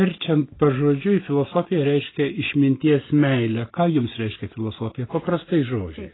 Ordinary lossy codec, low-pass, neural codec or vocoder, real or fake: AAC, 16 kbps; 7.2 kHz; none; real